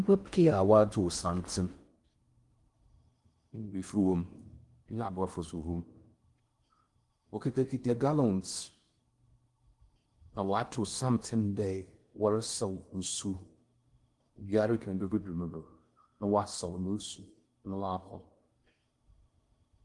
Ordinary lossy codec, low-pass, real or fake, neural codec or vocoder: Opus, 24 kbps; 10.8 kHz; fake; codec, 16 kHz in and 24 kHz out, 0.6 kbps, FocalCodec, streaming, 4096 codes